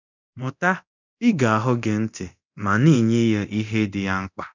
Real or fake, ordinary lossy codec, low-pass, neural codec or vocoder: fake; none; 7.2 kHz; codec, 24 kHz, 0.9 kbps, DualCodec